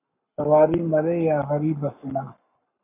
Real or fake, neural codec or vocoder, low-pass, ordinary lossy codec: fake; codec, 44.1 kHz, 7.8 kbps, Pupu-Codec; 3.6 kHz; MP3, 32 kbps